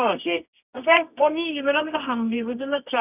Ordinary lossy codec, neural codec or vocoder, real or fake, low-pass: none; codec, 24 kHz, 0.9 kbps, WavTokenizer, medium music audio release; fake; 3.6 kHz